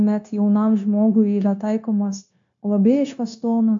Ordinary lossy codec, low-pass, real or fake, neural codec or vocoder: AAC, 64 kbps; 7.2 kHz; fake; codec, 16 kHz, 0.9 kbps, LongCat-Audio-Codec